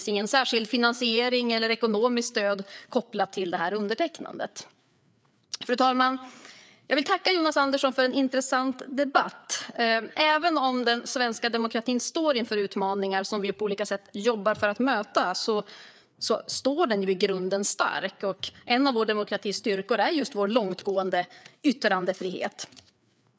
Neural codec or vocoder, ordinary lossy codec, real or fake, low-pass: codec, 16 kHz, 4 kbps, FreqCodec, larger model; none; fake; none